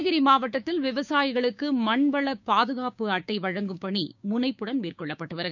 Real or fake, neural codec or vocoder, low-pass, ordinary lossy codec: fake; codec, 16 kHz, 6 kbps, DAC; 7.2 kHz; none